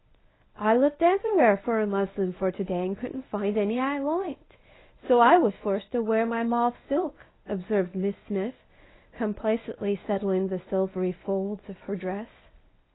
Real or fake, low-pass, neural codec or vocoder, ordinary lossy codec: fake; 7.2 kHz; codec, 24 kHz, 0.9 kbps, WavTokenizer, medium speech release version 1; AAC, 16 kbps